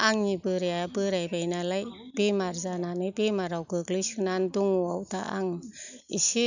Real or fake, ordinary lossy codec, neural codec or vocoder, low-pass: real; none; none; 7.2 kHz